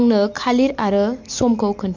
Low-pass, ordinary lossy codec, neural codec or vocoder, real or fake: 7.2 kHz; MP3, 48 kbps; none; real